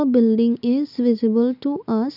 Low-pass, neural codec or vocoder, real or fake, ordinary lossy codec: 5.4 kHz; none; real; none